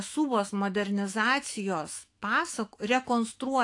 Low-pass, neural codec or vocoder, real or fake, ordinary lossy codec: 10.8 kHz; autoencoder, 48 kHz, 128 numbers a frame, DAC-VAE, trained on Japanese speech; fake; AAC, 48 kbps